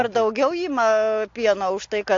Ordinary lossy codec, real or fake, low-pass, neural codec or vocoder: AAC, 48 kbps; real; 7.2 kHz; none